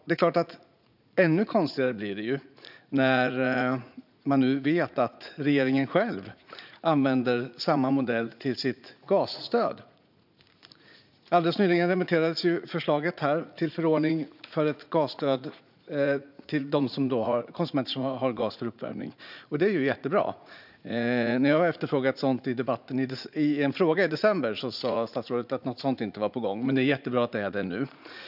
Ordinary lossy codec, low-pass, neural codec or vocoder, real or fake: none; 5.4 kHz; vocoder, 44.1 kHz, 80 mel bands, Vocos; fake